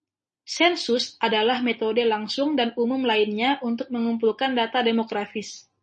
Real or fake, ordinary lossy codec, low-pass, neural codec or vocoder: real; MP3, 32 kbps; 10.8 kHz; none